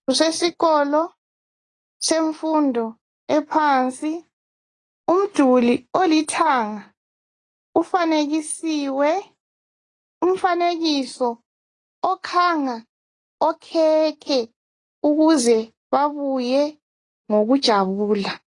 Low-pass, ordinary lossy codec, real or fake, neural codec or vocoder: 10.8 kHz; AAC, 32 kbps; real; none